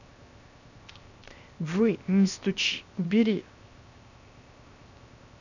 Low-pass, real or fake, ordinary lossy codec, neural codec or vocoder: 7.2 kHz; fake; none; codec, 16 kHz, 0.7 kbps, FocalCodec